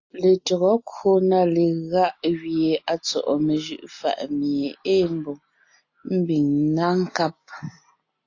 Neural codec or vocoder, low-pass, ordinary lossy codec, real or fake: none; 7.2 kHz; AAC, 48 kbps; real